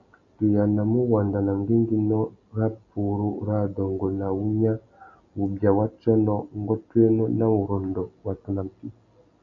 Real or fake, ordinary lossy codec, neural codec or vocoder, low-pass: real; MP3, 64 kbps; none; 7.2 kHz